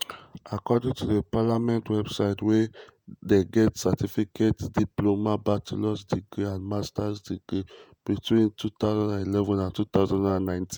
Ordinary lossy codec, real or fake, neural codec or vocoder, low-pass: none; fake; vocoder, 48 kHz, 128 mel bands, Vocos; none